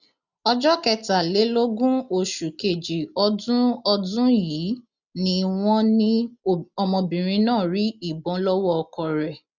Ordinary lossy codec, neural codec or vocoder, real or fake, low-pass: none; none; real; 7.2 kHz